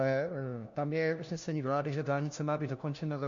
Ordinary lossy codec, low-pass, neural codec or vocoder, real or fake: MP3, 48 kbps; 7.2 kHz; codec, 16 kHz, 1 kbps, FunCodec, trained on LibriTTS, 50 frames a second; fake